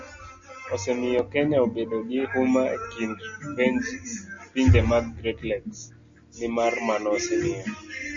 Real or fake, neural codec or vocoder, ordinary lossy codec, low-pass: real; none; Opus, 64 kbps; 7.2 kHz